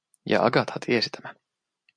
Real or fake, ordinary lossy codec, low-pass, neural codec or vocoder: real; MP3, 96 kbps; 9.9 kHz; none